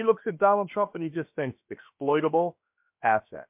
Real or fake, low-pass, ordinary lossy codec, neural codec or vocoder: fake; 3.6 kHz; MP3, 32 kbps; codec, 16 kHz, about 1 kbps, DyCAST, with the encoder's durations